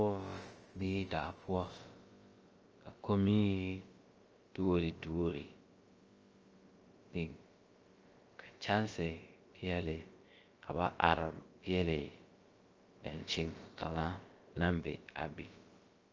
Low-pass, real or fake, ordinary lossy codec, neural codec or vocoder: 7.2 kHz; fake; Opus, 24 kbps; codec, 16 kHz, about 1 kbps, DyCAST, with the encoder's durations